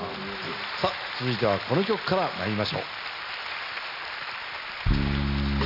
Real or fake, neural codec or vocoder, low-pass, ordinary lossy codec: real; none; 5.4 kHz; none